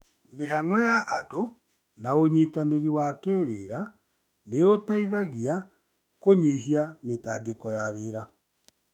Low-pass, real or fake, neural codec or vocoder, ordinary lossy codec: 19.8 kHz; fake; autoencoder, 48 kHz, 32 numbers a frame, DAC-VAE, trained on Japanese speech; none